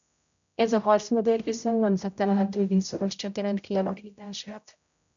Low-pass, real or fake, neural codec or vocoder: 7.2 kHz; fake; codec, 16 kHz, 0.5 kbps, X-Codec, HuBERT features, trained on general audio